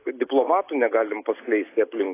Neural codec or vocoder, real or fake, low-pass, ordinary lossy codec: none; real; 3.6 kHz; AAC, 24 kbps